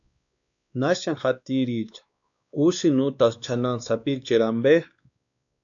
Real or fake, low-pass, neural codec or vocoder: fake; 7.2 kHz; codec, 16 kHz, 2 kbps, X-Codec, WavLM features, trained on Multilingual LibriSpeech